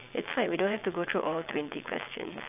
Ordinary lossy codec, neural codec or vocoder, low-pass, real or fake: AAC, 32 kbps; vocoder, 22.05 kHz, 80 mel bands, WaveNeXt; 3.6 kHz; fake